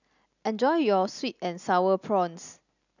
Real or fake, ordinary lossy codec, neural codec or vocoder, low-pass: real; none; none; 7.2 kHz